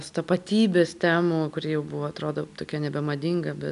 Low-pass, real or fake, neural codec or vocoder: 10.8 kHz; real; none